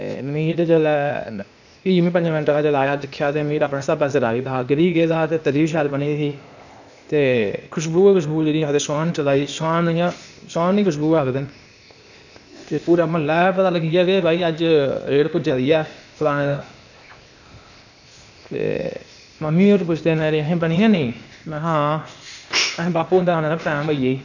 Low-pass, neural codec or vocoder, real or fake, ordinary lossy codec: 7.2 kHz; codec, 16 kHz, 0.8 kbps, ZipCodec; fake; none